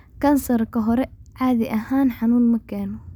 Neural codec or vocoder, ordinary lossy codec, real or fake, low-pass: none; none; real; 19.8 kHz